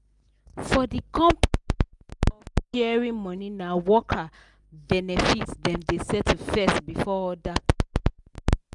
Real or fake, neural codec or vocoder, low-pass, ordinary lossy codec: real; none; 10.8 kHz; none